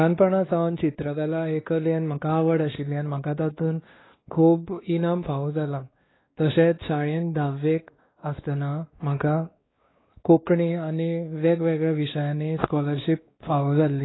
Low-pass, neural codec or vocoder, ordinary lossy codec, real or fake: 7.2 kHz; codec, 16 kHz, 2 kbps, X-Codec, WavLM features, trained on Multilingual LibriSpeech; AAC, 16 kbps; fake